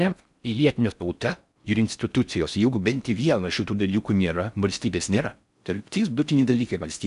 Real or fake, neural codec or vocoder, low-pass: fake; codec, 16 kHz in and 24 kHz out, 0.6 kbps, FocalCodec, streaming, 4096 codes; 10.8 kHz